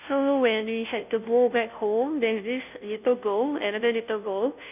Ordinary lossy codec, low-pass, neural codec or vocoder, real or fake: none; 3.6 kHz; codec, 16 kHz, 0.5 kbps, FunCodec, trained on Chinese and English, 25 frames a second; fake